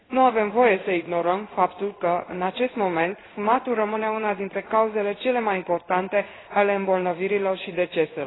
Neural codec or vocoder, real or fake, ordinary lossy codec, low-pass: codec, 16 kHz in and 24 kHz out, 1 kbps, XY-Tokenizer; fake; AAC, 16 kbps; 7.2 kHz